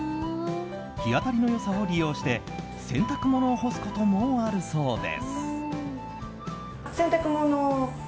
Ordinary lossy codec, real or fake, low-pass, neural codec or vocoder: none; real; none; none